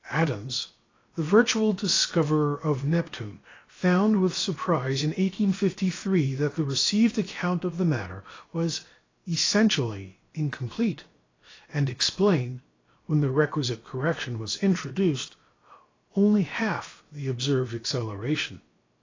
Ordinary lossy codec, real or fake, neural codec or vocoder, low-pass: AAC, 32 kbps; fake; codec, 16 kHz, 0.7 kbps, FocalCodec; 7.2 kHz